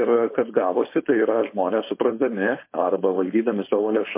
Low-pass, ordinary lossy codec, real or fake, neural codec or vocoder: 3.6 kHz; MP3, 24 kbps; fake; codec, 16 kHz, 4.8 kbps, FACodec